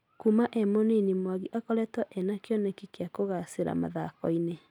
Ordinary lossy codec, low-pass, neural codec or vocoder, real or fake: none; 19.8 kHz; none; real